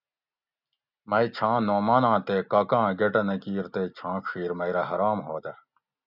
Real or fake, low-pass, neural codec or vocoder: real; 5.4 kHz; none